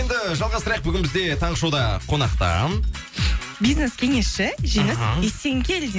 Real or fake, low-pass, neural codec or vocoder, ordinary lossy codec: real; none; none; none